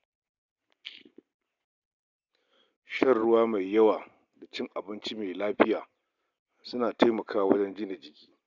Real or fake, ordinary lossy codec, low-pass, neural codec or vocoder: real; none; 7.2 kHz; none